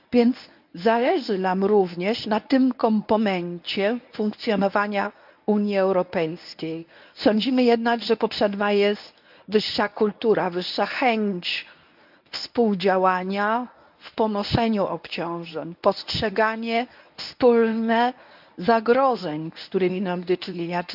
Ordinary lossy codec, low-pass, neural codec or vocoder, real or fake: none; 5.4 kHz; codec, 24 kHz, 0.9 kbps, WavTokenizer, medium speech release version 1; fake